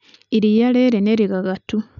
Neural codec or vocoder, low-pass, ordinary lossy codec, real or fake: none; 7.2 kHz; none; real